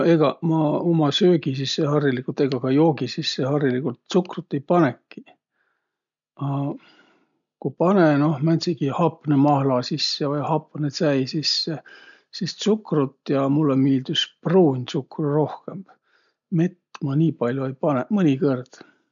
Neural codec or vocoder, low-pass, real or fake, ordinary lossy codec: none; 7.2 kHz; real; none